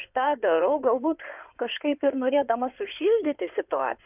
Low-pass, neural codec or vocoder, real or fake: 3.6 kHz; codec, 16 kHz in and 24 kHz out, 2.2 kbps, FireRedTTS-2 codec; fake